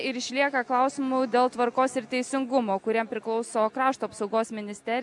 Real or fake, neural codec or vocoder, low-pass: real; none; 10.8 kHz